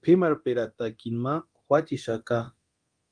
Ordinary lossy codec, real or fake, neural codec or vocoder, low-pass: Opus, 32 kbps; fake; codec, 24 kHz, 0.9 kbps, DualCodec; 9.9 kHz